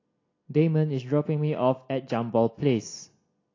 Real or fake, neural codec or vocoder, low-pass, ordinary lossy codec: real; none; 7.2 kHz; AAC, 32 kbps